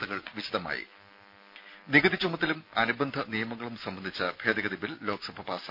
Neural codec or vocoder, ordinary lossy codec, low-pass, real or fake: none; none; 5.4 kHz; real